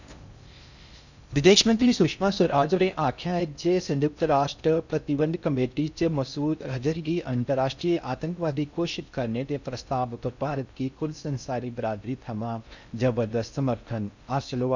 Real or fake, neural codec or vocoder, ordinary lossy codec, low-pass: fake; codec, 16 kHz in and 24 kHz out, 0.6 kbps, FocalCodec, streaming, 4096 codes; none; 7.2 kHz